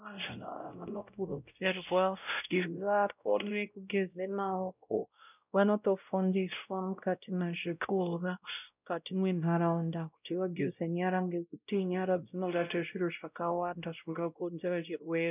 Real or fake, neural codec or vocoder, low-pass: fake; codec, 16 kHz, 0.5 kbps, X-Codec, WavLM features, trained on Multilingual LibriSpeech; 3.6 kHz